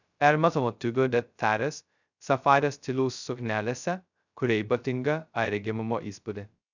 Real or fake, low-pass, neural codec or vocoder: fake; 7.2 kHz; codec, 16 kHz, 0.2 kbps, FocalCodec